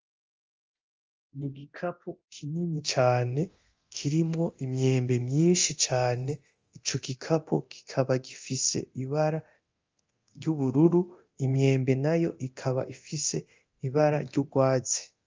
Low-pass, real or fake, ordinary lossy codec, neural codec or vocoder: 7.2 kHz; fake; Opus, 32 kbps; codec, 24 kHz, 0.9 kbps, DualCodec